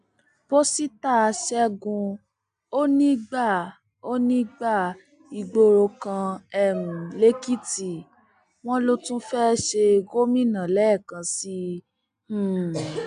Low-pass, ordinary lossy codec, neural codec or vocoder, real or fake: 9.9 kHz; none; none; real